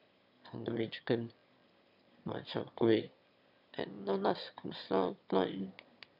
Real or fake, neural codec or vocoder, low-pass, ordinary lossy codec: fake; autoencoder, 22.05 kHz, a latent of 192 numbers a frame, VITS, trained on one speaker; 5.4 kHz; none